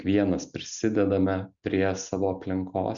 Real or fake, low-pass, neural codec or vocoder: real; 7.2 kHz; none